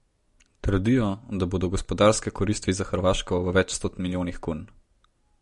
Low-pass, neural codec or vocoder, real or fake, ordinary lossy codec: 14.4 kHz; none; real; MP3, 48 kbps